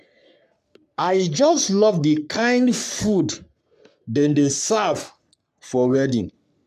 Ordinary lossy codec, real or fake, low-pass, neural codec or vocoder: none; fake; 14.4 kHz; codec, 44.1 kHz, 3.4 kbps, Pupu-Codec